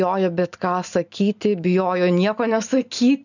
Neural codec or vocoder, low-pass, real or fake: none; 7.2 kHz; real